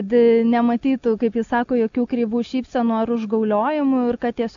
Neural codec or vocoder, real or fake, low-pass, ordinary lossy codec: none; real; 7.2 kHz; AAC, 64 kbps